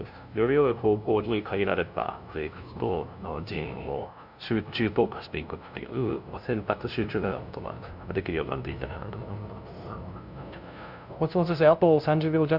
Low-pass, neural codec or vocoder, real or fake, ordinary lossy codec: 5.4 kHz; codec, 16 kHz, 0.5 kbps, FunCodec, trained on LibriTTS, 25 frames a second; fake; none